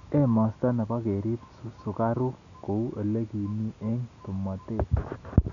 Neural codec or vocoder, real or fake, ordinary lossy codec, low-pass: none; real; none; 7.2 kHz